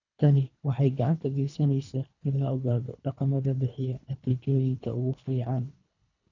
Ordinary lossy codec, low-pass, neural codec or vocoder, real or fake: none; 7.2 kHz; codec, 24 kHz, 3 kbps, HILCodec; fake